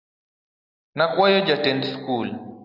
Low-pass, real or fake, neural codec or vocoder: 5.4 kHz; real; none